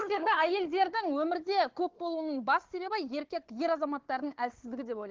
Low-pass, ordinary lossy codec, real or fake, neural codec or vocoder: 7.2 kHz; Opus, 16 kbps; fake; codec, 16 kHz, 4 kbps, FunCodec, trained on Chinese and English, 50 frames a second